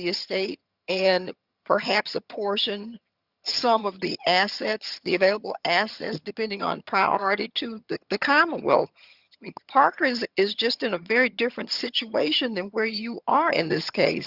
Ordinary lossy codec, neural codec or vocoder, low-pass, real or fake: Opus, 64 kbps; vocoder, 22.05 kHz, 80 mel bands, HiFi-GAN; 5.4 kHz; fake